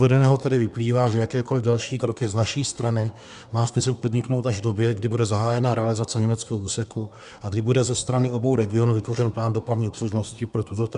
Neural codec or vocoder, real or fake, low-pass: codec, 24 kHz, 1 kbps, SNAC; fake; 10.8 kHz